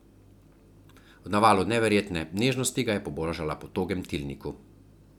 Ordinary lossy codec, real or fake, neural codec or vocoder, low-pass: none; real; none; 19.8 kHz